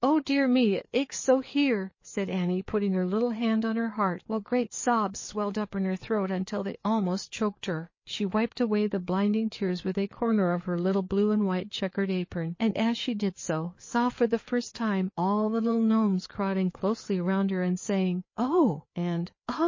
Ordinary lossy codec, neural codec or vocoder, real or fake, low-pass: MP3, 32 kbps; codec, 16 kHz, 4 kbps, FreqCodec, larger model; fake; 7.2 kHz